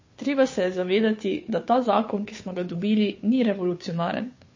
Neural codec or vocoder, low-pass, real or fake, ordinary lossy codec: codec, 16 kHz, 2 kbps, FunCodec, trained on Chinese and English, 25 frames a second; 7.2 kHz; fake; MP3, 32 kbps